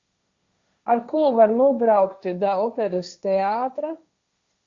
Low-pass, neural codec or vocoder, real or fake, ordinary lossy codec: 7.2 kHz; codec, 16 kHz, 1.1 kbps, Voila-Tokenizer; fake; Opus, 64 kbps